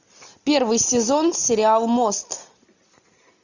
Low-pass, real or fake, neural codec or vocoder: 7.2 kHz; real; none